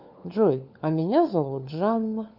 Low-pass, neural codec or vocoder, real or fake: 5.4 kHz; codec, 16 kHz, 4 kbps, FunCodec, trained on LibriTTS, 50 frames a second; fake